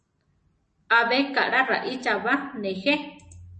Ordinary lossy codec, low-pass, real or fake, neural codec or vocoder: MP3, 32 kbps; 10.8 kHz; real; none